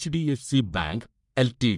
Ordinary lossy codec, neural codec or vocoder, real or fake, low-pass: none; codec, 44.1 kHz, 1.7 kbps, Pupu-Codec; fake; 10.8 kHz